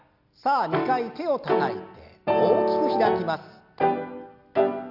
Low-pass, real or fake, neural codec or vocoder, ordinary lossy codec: 5.4 kHz; real; none; AAC, 48 kbps